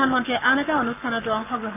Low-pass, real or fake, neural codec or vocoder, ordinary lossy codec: 3.6 kHz; fake; codec, 44.1 kHz, 7.8 kbps, Pupu-Codec; none